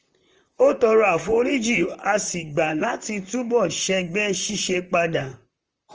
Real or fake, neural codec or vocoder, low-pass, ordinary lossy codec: fake; vocoder, 44.1 kHz, 128 mel bands, Pupu-Vocoder; 7.2 kHz; Opus, 24 kbps